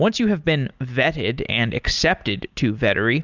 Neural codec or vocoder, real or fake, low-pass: none; real; 7.2 kHz